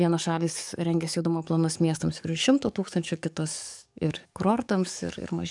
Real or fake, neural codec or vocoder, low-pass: fake; codec, 44.1 kHz, 7.8 kbps, DAC; 10.8 kHz